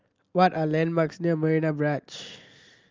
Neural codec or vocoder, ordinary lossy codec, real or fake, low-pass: none; Opus, 32 kbps; real; 7.2 kHz